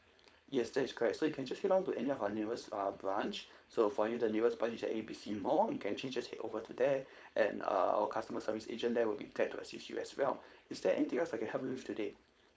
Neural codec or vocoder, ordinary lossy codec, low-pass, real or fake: codec, 16 kHz, 4.8 kbps, FACodec; none; none; fake